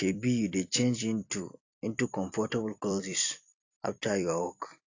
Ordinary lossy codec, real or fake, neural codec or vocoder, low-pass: AAC, 48 kbps; fake; vocoder, 44.1 kHz, 128 mel bands every 256 samples, BigVGAN v2; 7.2 kHz